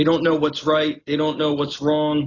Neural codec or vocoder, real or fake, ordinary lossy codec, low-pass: none; real; AAC, 32 kbps; 7.2 kHz